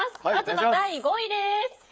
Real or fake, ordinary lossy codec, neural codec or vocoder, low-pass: fake; none; codec, 16 kHz, 8 kbps, FreqCodec, smaller model; none